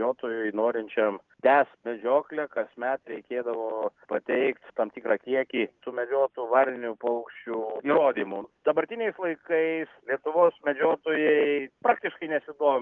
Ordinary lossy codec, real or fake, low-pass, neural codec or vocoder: Opus, 32 kbps; real; 7.2 kHz; none